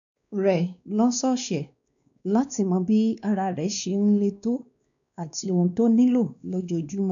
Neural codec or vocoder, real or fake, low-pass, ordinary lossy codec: codec, 16 kHz, 2 kbps, X-Codec, WavLM features, trained on Multilingual LibriSpeech; fake; 7.2 kHz; none